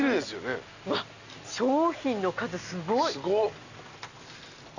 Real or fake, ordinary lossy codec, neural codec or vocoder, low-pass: real; none; none; 7.2 kHz